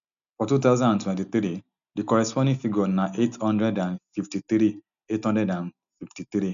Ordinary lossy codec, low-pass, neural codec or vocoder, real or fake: none; 7.2 kHz; none; real